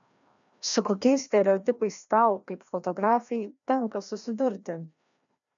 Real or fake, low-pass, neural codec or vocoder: fake; 7.2 kHz; codec, 16 kHz, 1 kbps, FreqCodec, larger model